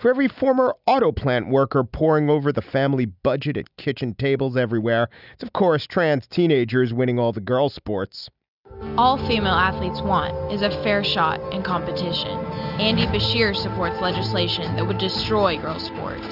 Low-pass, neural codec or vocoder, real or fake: 5.4 kHz; none; real